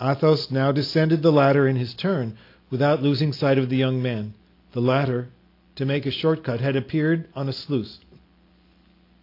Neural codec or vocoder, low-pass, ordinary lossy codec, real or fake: none; 5.4 kHz; AAC, 32 kbps; real